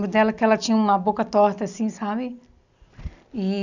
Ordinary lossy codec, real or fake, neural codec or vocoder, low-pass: none; real; none; 7.2 kHz